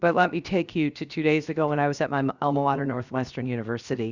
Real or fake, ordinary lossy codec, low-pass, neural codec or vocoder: fake; Opus, 64 kbps; 7.2 kHz; codec, 16 kHz, 0.7 kbps, FocalCodec